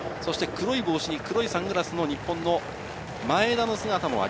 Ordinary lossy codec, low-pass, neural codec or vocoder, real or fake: none; none; none; real